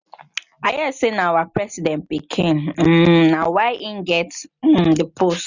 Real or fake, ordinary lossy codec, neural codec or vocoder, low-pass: real; none; none; 7.2 kHz